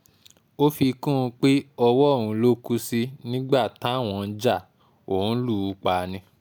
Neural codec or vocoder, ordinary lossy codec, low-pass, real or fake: none; none; none; real